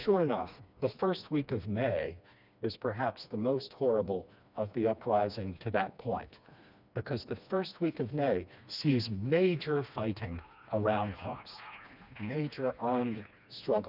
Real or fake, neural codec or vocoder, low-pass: fake; codec, 16 kHz, 2 kbps, FreqCodec, smaller model; 5.4 kHz